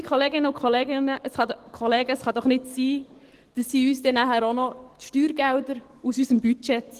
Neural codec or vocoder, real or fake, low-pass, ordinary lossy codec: codec, 44.1 kHz, 7.8 kbps, DAC; fake; 14.4 kHz; Opus, 16 kbps